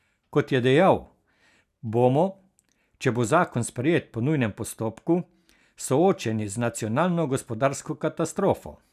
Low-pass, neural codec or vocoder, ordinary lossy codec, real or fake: 14.4 kHz; none; none; real